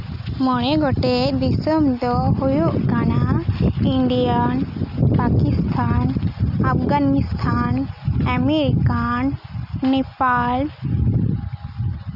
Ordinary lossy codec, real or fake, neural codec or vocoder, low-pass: none; real; none; 5.4 kHz